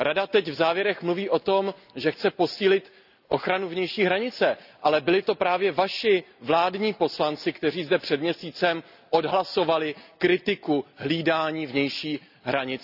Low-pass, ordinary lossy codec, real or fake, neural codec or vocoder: 5.4 kHz; none; real; none